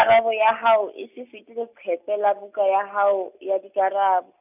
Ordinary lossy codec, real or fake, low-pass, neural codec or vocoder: none; real; 3.6 kHz; none